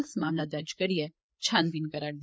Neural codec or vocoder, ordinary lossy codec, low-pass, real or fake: codec, 16 kHz, 4 kbps, FreqCodec, larger model; none; none; fake